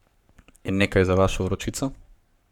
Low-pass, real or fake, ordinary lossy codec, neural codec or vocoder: 19.8 kHz; fake; none; codec, 44.1 kHz, 7.8 kbps, Pupu-Codec